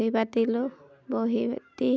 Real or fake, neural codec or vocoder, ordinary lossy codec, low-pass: real; none; none; none